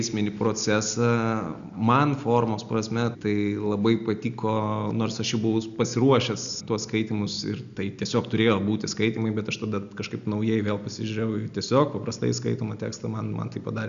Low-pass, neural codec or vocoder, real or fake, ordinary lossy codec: 7.2 kHz; none; real; MP3, 96 kbps